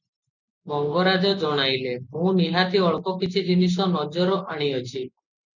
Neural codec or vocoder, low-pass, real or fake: none; 7.2 kHz; real